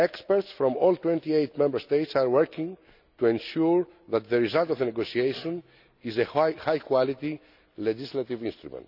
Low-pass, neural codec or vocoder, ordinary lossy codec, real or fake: 5.4 kHz; none; none; real